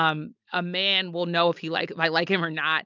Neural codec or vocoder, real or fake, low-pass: none; real; 7.2 kHz